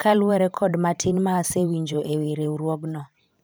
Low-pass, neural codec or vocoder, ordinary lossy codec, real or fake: none; vocoder, 44.1 kHz, 128 mel bands every 512 samples, BigVGAN v2; none; fake